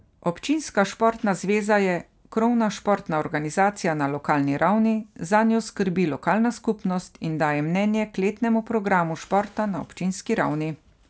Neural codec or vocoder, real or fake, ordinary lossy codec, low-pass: none; real; none; none